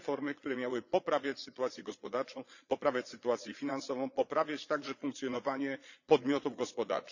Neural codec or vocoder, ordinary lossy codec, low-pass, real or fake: vocoder, 22.05 kHz, 80 mel bands, Vocos; none; 7.2 kHz; fake